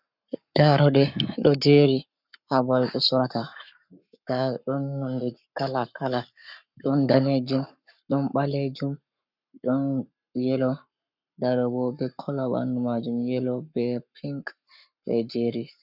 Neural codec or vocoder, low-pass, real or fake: codec, 44.1 kHz, 7.8 kbps, Pupu-Codec; 5.4 kHz; fake